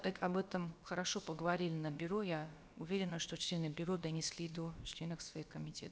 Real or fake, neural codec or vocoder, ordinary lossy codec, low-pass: fake; codec, 16 kHz, about 1 kbps, DyCAST, with the encoder's durations; none; none